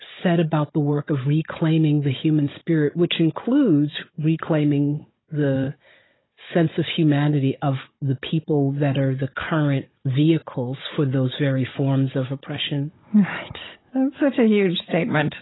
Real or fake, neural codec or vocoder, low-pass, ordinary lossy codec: fake; codec, 16 kHz, 16 kbps, FunCodec, trained on Chinese and English, 50 frames a second; 7.2 kHz; AAC, 16 kbps